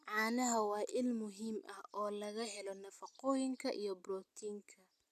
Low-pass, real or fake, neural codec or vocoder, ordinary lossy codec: 14.4 kHz; real; none; none